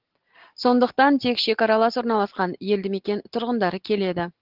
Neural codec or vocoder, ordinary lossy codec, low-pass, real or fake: none; Opus, 16 kbps; 5.4 kHz; real